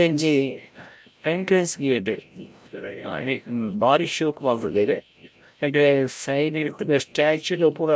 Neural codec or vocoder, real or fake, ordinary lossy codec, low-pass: codec, 16 kHz, 0.5 kbps, FreqCodec, larger model; fake; none; none